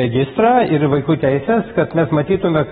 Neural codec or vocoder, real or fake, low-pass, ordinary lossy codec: none; real; 10.8 kHz; AAC, 16 kbps